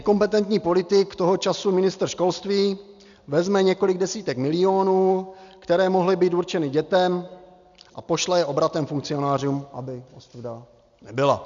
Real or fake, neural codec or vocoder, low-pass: real; none; 7.2 kHz